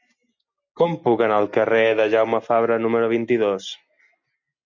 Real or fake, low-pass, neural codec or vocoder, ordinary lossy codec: real; 7.2 kHz; none; MP3, 64 kbps